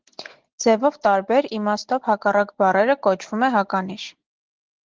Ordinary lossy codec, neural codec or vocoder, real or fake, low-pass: Opus, 16 kbps; none; real; 7.2 kHz